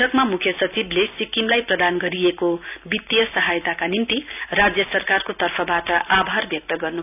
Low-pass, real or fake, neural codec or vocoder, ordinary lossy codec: 3.6 kHz; real; none; none